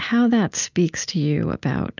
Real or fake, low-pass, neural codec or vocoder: real; 7.2 kHz; none